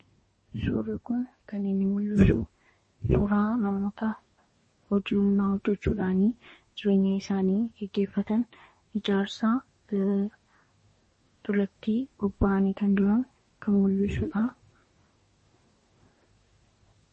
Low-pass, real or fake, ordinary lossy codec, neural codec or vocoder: 10.8 kHz; fake; MP3, 32 kbps; codec, 24 kHz, 1 kbps, SNAC